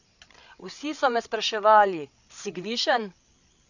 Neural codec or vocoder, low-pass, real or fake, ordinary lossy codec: codec, 16 kHz, 8 kbps, FreqCodec, larger model; 7.2 kHz; fake; none